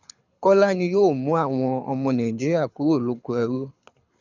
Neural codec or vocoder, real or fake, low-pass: codec, 24 kHz, 6 kbps, HILCodec; fake; 7.2 kHz